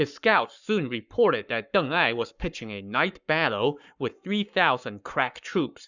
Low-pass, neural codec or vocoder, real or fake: 7.2 kHz; codec, 44.1 kHz, 7.8 kbps, Pupu-Codec; fake